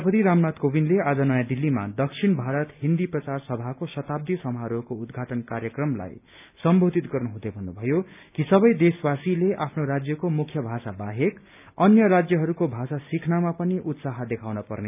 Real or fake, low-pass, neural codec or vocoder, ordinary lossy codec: real; 3.6 kHz; none; none